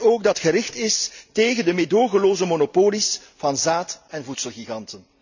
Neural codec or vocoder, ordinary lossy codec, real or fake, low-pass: none; none; real; 7.2 kHz